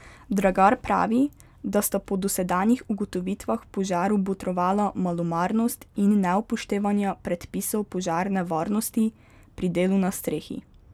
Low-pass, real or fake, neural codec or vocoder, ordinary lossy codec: 19.8 kHz; real; none; none